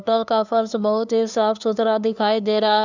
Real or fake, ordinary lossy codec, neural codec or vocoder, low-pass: fake; none; codec, 16 kHz, 2 kbps, FunCodec, trained on LibriTTS, 25 frames a second; 7.2 kHz